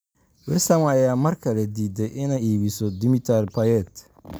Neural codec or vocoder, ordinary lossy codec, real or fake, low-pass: none; none; real; none